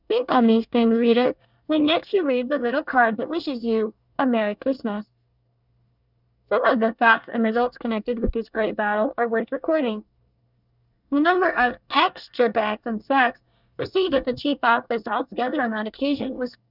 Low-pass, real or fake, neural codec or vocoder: 5.4 kHz; fake; codec, 24 kHz, 1 kbps, SNAC